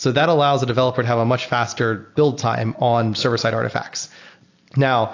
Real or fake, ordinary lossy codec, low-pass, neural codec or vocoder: real; AAC, 48 kbps; 7.2 kHz; none